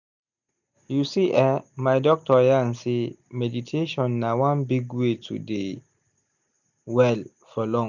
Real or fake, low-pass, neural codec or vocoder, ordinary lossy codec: real; 7.2 kHz; none; none